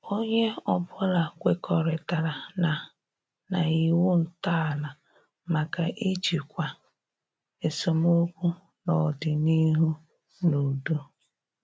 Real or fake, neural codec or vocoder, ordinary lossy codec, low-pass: real; none; none; none